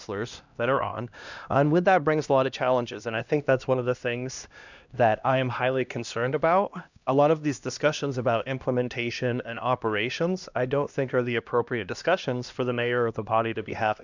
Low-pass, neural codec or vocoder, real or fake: 7.2 kHz; codec, 16 kHz, 1 kbps, X-Codec, HuBERT features, trained on LibriSpeech; fake